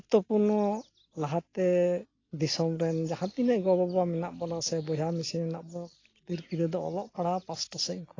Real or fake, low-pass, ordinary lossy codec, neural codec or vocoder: real; 7.2 kHz; AAC, 32 kbps; none